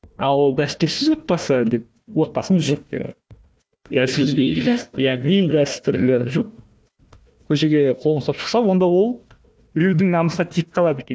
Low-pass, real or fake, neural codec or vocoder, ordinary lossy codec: none; fake; codec, 16 kHz, 1 kbps, FunCodec, trained on Chinese and English, 50 frames a second; none